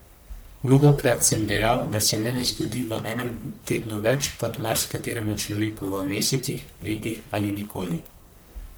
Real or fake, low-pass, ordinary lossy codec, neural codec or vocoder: fake; none; none; codec, 44.1 kHz, 1.7 kbps, Pupu-Codec